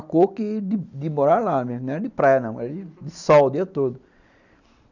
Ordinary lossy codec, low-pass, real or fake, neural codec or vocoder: none; 7.2 kHz; real; none